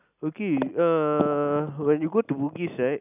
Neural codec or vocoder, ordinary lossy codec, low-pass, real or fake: none; none; 3.6 kHz; real